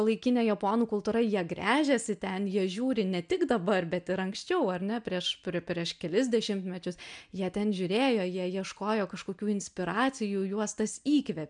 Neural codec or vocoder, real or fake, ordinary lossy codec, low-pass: none; real; MP3, 96 kbps; 9.9 kHz